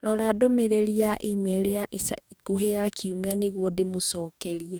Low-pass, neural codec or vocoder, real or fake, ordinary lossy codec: none; codec, 44.1 kHz, 2.6 kbps, DAC; fake; none